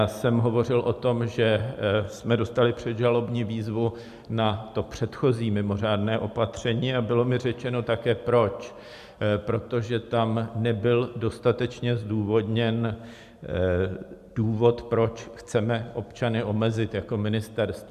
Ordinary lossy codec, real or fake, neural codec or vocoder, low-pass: MP3, 96 kbps; real; none; 14.4 kHz